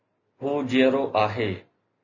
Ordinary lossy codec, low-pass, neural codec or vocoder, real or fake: MP3, 32 kbps; 7.2 kHz; none; real